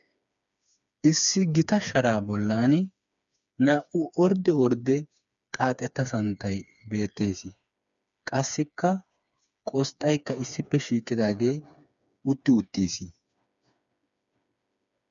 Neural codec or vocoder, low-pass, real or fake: codec, 16 kHz, 4 kbps, FreqCodec, smaller model; 7.2 kHz; fake